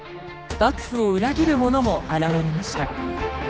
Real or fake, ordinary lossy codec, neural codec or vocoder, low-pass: fake; none; codec, 16 kHz, 2 kbps, X-Codec, HuBERT features, trained on general audio; none